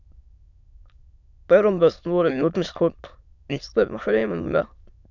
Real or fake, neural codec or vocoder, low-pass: fake; autoencoder, 22.05 kHz, a latent of 192 numbers a frame, VITS, trained on many speakers; 7.2 kHz